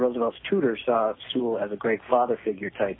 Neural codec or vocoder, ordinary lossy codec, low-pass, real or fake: none; AAC, 16 kbps; 7.2 kHz; real